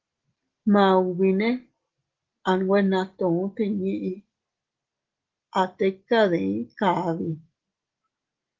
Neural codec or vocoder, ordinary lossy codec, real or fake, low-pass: none; Opus, 16 kbps; real; 7.2 kHz